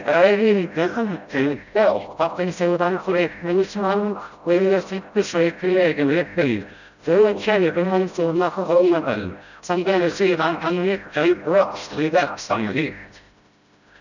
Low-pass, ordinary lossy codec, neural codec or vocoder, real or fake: 7.2 kHz; none; codec, 16 kHz, 0.5 kbps, FreqCodec, smaller model; fake